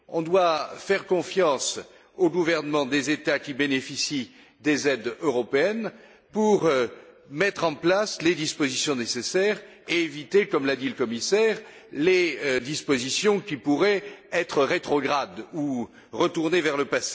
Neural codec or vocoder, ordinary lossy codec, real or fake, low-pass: none; none; real; none